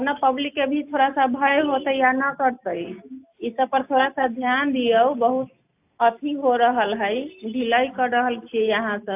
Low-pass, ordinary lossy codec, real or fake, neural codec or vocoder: 3.6 kHz; AAC, 32 kbps; real; none